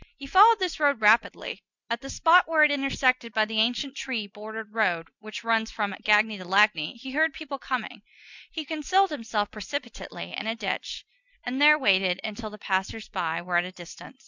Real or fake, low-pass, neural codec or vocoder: real; 7.2 kHz; none